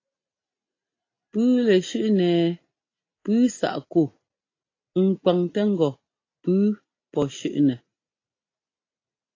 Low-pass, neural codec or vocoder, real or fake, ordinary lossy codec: 7.2 kHz; none; real; AAC, 32 kbps